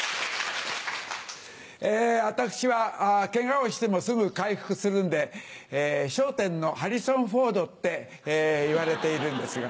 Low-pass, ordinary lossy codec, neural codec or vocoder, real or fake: none; none; none; real